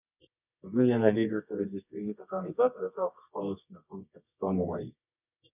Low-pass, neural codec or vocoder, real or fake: 3.6 kHz; codec, 24 kHz, 0.9 kbps, WavTokenizer, medium music audio release; fake